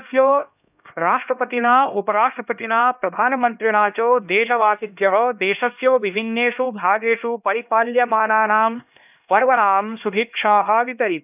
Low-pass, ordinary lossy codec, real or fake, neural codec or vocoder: 3.6 kHz; none; fake; codec, 16 kHz, 1 kbps, X-Codec, HuBERT features, trained on LibriSpeech